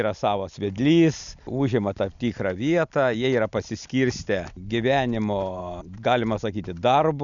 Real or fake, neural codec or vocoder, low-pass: real; none; 7.2 kHz